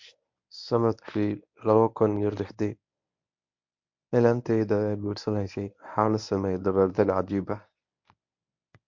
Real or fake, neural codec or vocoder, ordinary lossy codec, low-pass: fake; codec, 24 kHz, 0.9 kbps, WavTokenizer, medium speech release version 1; MP3, 48 kbps; 7.2 kHz